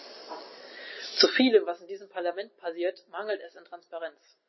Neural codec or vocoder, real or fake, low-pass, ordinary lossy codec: none; real; 7.2 kHz; MP3, 24 kbps